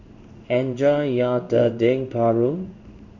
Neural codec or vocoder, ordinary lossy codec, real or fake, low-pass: codec, 16 kHz in and 24 kHz out, 1 kbps, XY-Tokenizer; none; fake; 7.2 kHz